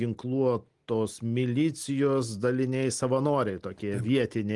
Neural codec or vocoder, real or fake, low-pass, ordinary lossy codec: none; real; 10.8 kHz; Opus, 24 kbps